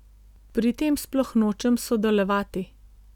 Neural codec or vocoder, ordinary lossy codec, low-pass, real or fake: none; none; 19.8 kHz; real